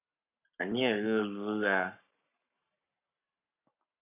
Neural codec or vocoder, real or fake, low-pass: codec, 44.1 kHz, 7.8 kbps, Pupu-Codec; fake; 3.6 kHz